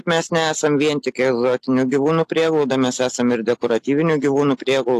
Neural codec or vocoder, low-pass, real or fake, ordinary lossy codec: none; 14.4 kHz; real; AAC, 64 kbps